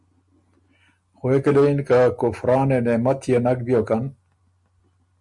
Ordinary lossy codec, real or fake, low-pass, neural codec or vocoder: MP3, 64 kbps; real; 10.8 kHz; none